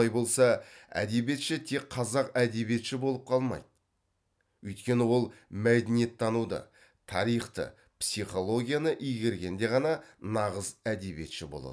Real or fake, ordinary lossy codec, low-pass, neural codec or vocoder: real; none; none; none